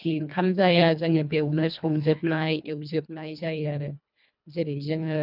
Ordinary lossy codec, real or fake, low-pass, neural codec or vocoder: none; fake; 5.4 kHz; codec, 24 kHz, 1.5 kbps, HILCodec